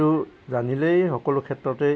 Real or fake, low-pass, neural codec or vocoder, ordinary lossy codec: real; none; none; none